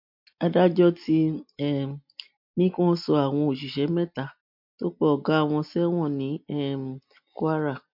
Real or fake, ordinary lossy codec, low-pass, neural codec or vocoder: real; MP3, 48 kbps; 5.4 kHz; none